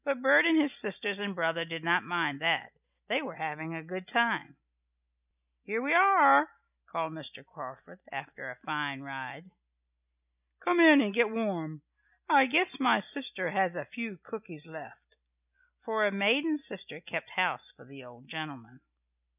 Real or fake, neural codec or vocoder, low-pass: real; none; 3.6 kHz